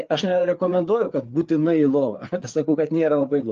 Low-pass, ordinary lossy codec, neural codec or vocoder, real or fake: 7.2 kHz; Opus, 32 kbps; codec, 16 kHz, 4 kbps, FreqCodec, larger model; fake